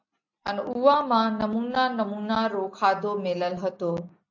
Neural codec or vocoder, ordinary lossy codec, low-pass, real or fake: none; AAC, 48 kbps; 7.2 kHz; real